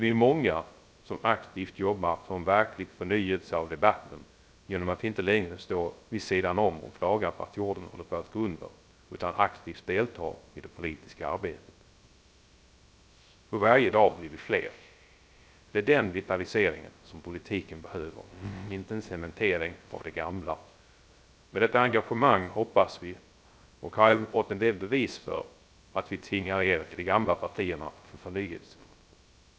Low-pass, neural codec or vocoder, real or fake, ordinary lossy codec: none; codec, 16 kHz, 0.3 kbps, FocalCodec; fake; none